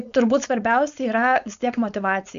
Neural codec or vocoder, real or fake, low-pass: codec, 16 kHz, 4.8 kbps, FACodec; fake; 7.2 kHz